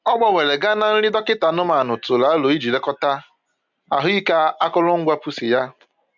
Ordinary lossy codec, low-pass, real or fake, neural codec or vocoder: MP3, 64 kbps; 7.2 kHz; real; none